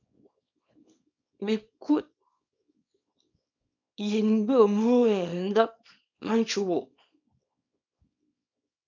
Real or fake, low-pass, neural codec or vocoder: fake; 7.2 kHz; codec, 24 kHz, 0.9 kbps, WavTokenizer, small release